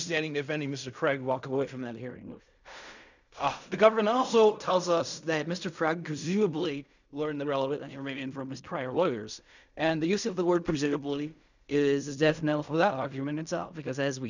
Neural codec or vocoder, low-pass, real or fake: codec, 16 kHz in and 24 kHz out, 0.4 kbps, LongCat-Audio-Codec, fine tuned four codebook decoder; 7.2 kHz; fake